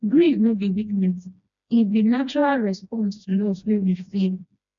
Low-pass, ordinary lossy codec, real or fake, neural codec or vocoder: 7.2 kHz; MP3, 64 kbps; fake; codec, 16 kHz, 1 kbps, FreqCodec, smaller model